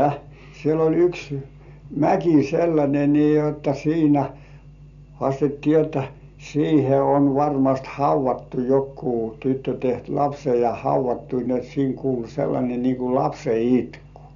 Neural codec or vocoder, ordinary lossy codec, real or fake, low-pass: none; MP3, 96 kbps; real; 7.2 kHz